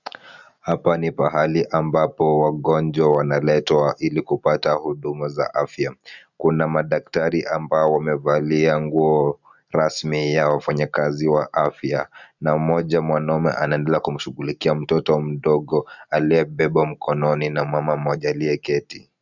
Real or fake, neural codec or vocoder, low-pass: real; none; 7.2 kHz